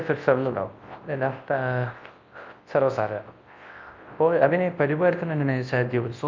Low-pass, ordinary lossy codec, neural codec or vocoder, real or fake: 7.2 kHz; Opus, 24 kbps; codec, 24 kHz, 0.9 kbps, WavTokenizer, large speech release; fake